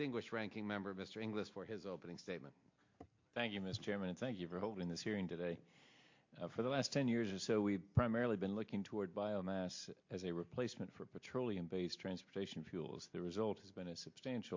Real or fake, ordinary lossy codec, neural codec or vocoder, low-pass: real; MP3, 48 kbps; none; 7.2 kHz